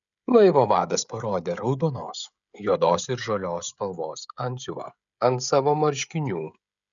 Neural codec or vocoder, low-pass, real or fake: codec, 16 kHz, 16 kbps, FreqCodec, smaller model; 7.2 kHz; fake